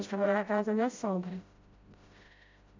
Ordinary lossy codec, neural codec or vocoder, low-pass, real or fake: MP3, 48 kbps; codec, 16 kHz, 0.5 kbps, FreqCodec, smaller model; 7.2 kHz; fake